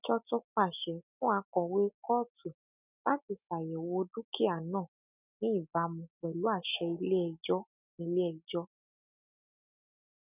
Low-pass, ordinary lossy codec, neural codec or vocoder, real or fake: 3.6 kHz; none; none; real